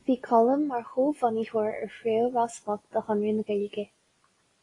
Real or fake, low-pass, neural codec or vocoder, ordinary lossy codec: real; 10.8 kHz; none; AAC, 32 kbps